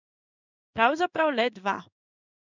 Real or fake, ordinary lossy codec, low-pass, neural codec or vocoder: fake; none; 7.2 kHz; codec, 16 kHz in and 24 kHz out, 1 kbps, XY-Tokenizer